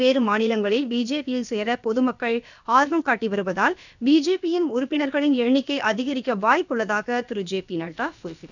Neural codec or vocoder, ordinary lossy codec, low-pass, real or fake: codec, 16 kHz, about 1 kbps, DyCAST, with the encoder's durations; none; 7.2 kHz; fake